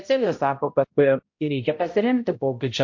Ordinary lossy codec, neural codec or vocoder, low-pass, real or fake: MP3, 48 kbps; codec, 16 kHz, 0.5 kbps, X-Codec, HuBERT features, trained on balanced general audio; 7.2 kHz; fake